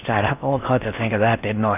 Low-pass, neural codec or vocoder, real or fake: 3.6 kHz; codec, 16 kHz in and 24 kHz out, 0.6 kbps, FocalCodec, streaming, 4096 codes; fake